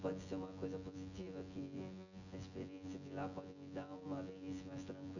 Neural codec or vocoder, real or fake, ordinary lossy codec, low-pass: vocoder, 24 kHz, 100 mel bands, Vocos; fake; none; 7.2 kHz